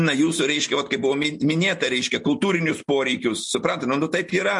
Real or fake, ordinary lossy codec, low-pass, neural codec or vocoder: real; MP3, 48 kbps; 10.8 kHz; none